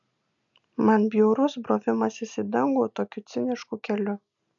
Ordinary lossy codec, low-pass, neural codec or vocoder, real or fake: MP3, 96 kbps; 7.2 kHz; none; real